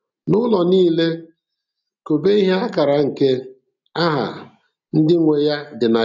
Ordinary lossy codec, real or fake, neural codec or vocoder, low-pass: none; real; none; 7.2 kHz